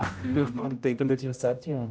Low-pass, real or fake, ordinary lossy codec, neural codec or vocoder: none; fake; none; codec, 16 kHz, 0.5 kbps, X-Codec, HuBERT features, trained on general audio